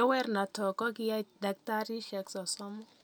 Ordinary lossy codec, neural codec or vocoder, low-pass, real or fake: none; none; none; real